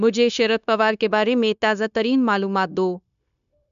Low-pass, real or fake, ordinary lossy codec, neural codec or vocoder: 7.2 kHz; fake; none; codec, 16 kHz, 0.9 kbps, LongCat-Audio-Codec